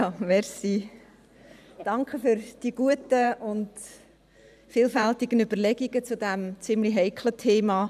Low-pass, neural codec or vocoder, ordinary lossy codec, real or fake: 14.4 kHz; vocoder, 44.1 kHz, 128 mel bands every 512 samples, BigVGAN v2; none; fake